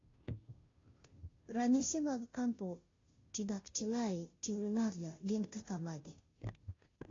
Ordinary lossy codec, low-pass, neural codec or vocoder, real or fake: AAC, 32 kbps; 7.2 kHz; codec, 16 kHz, 0.5 kbps, FunCodec, trained on Chinese and English, 25 frames a second; fake